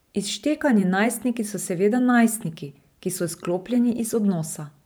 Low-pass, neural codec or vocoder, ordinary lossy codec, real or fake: none; vocoder, 44.1 kHz, 128 mel bands every 512 samples, BigVGAN v2; none; fake